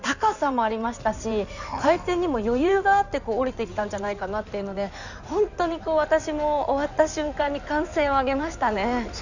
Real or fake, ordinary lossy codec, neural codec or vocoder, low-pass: fake; none; codec, 16 kHz in and 24 kHz out, 2.2 kbps, FireRedTTS-2 codec; 7.2 kHz